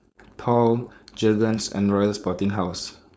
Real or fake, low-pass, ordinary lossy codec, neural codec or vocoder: fake; none; none; codec, 16 kHz, 4.8 kbps, FACodec